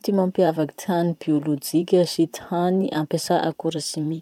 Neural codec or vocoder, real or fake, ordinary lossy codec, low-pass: vocoder, 44.1 kHz, 128 mel bands, Pupu-Vocoder; fake; none; 19.8 kHz